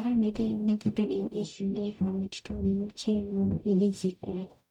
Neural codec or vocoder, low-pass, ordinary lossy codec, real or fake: codec, 44.1 kHz, 0.9 kbps, DAC; 19.8 kHz; none; fake